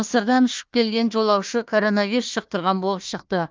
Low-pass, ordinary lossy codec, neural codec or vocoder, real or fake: 7.2 kHz; Opus, 24 kbps; codec, 16 kHz, 1 kbps, FunCodec, trained on Chinese and English, 50 frames a second; fake